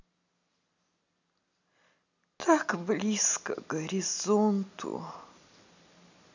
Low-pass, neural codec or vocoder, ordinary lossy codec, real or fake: 7.2 kHz; none; none; real